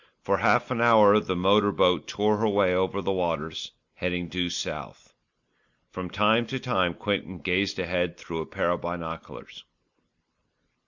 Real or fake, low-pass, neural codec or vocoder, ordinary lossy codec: real; 7.2 kHz; none; Opus, 64 kbps